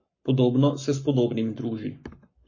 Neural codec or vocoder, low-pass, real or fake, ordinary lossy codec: codec, 44.1 kHz, 7.8 kbps, Pupu-Codec; 7.2 kHz; fake; MP3, 32 kbps